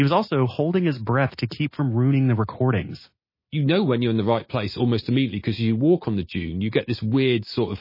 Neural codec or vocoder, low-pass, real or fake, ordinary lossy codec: none; 5.4 kHz; real; MP3, 24 kbps